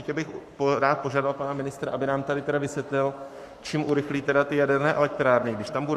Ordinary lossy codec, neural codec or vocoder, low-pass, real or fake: Opus, 64 kbps; codec, 44.1 kHz, 7.8 kbps, Pupu-Codec; 14.4 kHz; fake